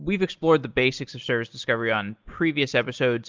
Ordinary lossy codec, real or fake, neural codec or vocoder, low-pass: Opus, 32 kbps; real; none; 7.2 kHz